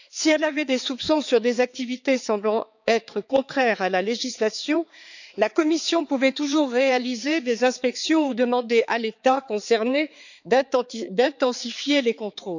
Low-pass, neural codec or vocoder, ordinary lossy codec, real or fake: 7.2 kHz; codec, 16 kHz, 4 kbps, X-Codec, HuBERT features, trained on balanced general audio; none; fake